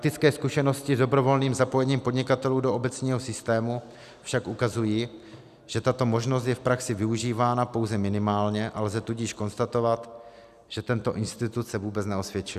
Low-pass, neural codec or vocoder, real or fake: 14.4 kHz; none; real